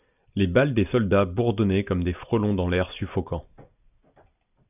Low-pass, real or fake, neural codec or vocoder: 3.6 kHz; real; none